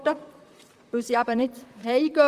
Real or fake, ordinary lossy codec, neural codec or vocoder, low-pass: fake; Opus, 24 kbps; vocoder, 44.1 kHz, 128 mel bands, Pupu-Vocoder; 14.4 kHz